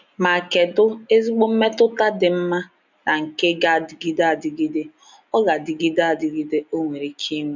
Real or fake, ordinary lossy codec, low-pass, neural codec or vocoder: real; none; 7.2 kHz; none